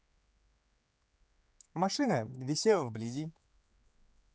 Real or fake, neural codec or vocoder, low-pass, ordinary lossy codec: fake; codec, 16 kHz, 4 kbps, X-Codec, HuBERT features, trained on general audio; none; none